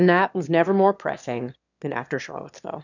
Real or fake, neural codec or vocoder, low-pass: fake; autoencoder, 22.05 kHz, a latent of 192 numbers a frame, VITS, trained on one speaker; 7.2 kHz